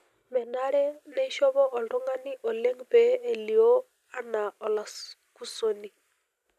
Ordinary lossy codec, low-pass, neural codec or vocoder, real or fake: none; 14.4 kHz; none; real